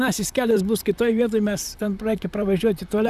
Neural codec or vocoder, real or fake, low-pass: vocoder, 44.1 kHz, 128 mel bands, Pupu-Vocoder; fake; 14.4 kHz